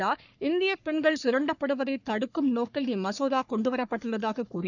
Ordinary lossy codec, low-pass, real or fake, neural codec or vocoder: none; 7.2 kHz; fake; codec, 44.1 kHz, 3.4 kbps, Pupu-Codec